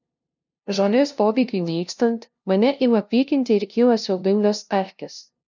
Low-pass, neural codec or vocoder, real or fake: 7.2 kHz; codec, 16 kHz, 0.5 kbps, FunCodec, trained on LibriTTS, 25 frames a second; fake